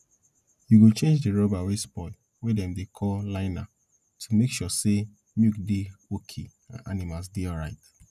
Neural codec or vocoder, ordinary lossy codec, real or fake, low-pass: none; none; real; 14.4 kHz